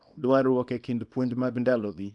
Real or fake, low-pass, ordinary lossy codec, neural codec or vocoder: fake; 10.8 kHz; none; codec, 24 kHz, 0.9 kbps, WavTokenizer, small release